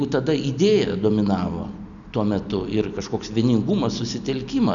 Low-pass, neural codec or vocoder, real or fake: 7.2 kHz; none; real